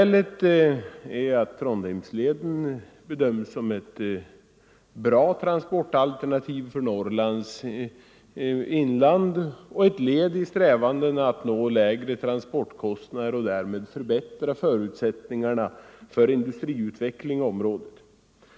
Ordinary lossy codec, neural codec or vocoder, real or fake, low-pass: none; none; real; none